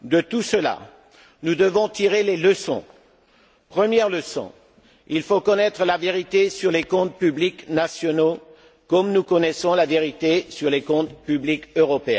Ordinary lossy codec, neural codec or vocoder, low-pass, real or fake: none; none; none; real